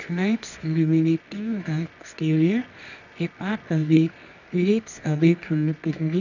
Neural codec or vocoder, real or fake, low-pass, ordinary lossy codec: codec, 24 kHz, 0.9 kbps, WavTokenizer, medium music audio release; fake; 7.2 kHz; none